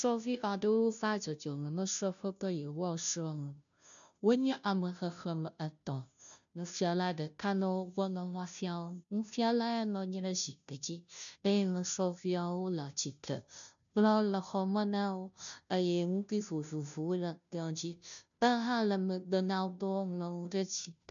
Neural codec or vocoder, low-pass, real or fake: codec, 16 kHz, 0.5 kbps, FunCodec, trained on Chinese and English, 25 frames a second; 7.2 kHz; fake